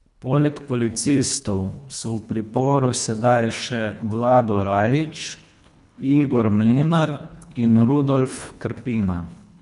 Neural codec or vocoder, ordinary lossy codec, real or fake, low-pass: codec, 24 kHz, 1.5 kbps, HILCodec; none; fake; 10.8 kHz